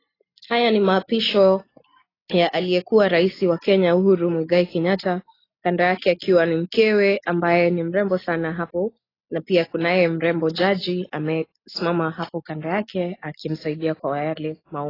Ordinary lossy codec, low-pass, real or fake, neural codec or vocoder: AAC, 24 kbps; 5.4 kHz; real; none